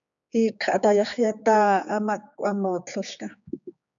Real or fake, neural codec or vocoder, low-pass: fake; codec, 16 kHz, 4 kbps, X-Codec, HuBERT features, trained on general audio; 7.2 kHz